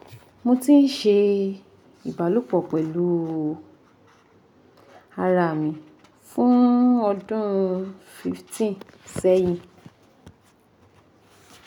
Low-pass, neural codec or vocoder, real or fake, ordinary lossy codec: 19.8 kHz; none; real; none